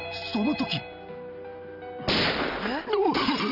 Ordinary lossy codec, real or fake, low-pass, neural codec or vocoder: none; real; 5.4 kHz; none